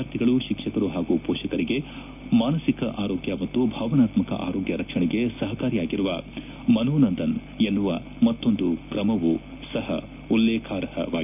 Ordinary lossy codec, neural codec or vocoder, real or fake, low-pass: none; none; real; 3.6 kHz